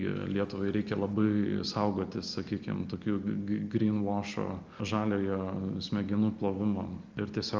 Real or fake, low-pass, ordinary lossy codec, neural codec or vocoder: real; 7.2 kHz; Opus, 32 kbps; none